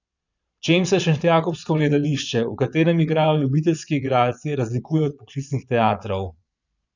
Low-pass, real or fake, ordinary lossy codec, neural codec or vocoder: 7.2 kHz; fake; none; vocoder, 22.05 kHz, 80 mel bands, Vocos